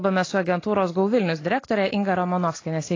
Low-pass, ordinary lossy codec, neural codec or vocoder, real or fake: 7.2 kHz; AAC, 32 kbps; none; real